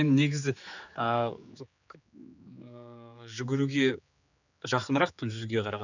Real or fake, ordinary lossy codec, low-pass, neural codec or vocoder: fake; none; 7.2 kHz; codec, 16 kHz, 4 kbps, X-Codec, HuBERT features, trained on general audio